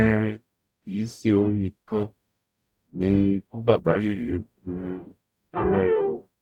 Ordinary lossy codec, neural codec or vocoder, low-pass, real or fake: none; codec, 44.1 kHz, 0.9 kbps, DAC; 19.8 kHz; fake